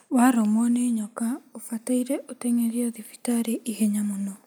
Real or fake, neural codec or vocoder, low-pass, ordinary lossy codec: real; none; none; none